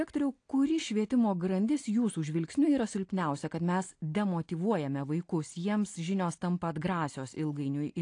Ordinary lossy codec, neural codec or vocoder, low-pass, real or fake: AAC, 48 kbps; none; 9.9 kHz; real